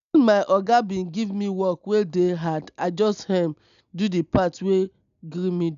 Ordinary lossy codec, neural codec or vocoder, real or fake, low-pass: none; none; real; 7.2 kHz